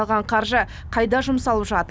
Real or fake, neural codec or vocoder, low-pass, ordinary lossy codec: real; none; none; none